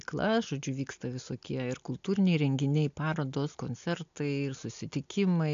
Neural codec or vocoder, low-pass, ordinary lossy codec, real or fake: none; 7.2 kHz; AAC, 64 kbps; real